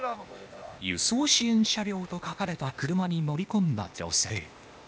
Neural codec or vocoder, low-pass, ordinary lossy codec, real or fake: codec, 16 kHz, 0.8 kbps, ZipCodec; none; none; fake